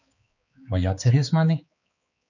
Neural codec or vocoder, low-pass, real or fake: codec, 16 kHz, 4 kbps, X-Codec, HuBERT features, trained on balanced general audio; 7.2 kHz; fake